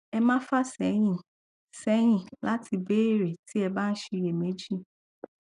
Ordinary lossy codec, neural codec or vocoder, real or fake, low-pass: none; none; real; 10.8 kHz